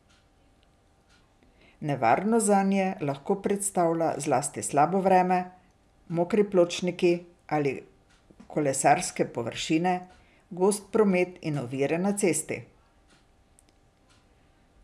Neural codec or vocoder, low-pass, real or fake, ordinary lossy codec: none; none; real; none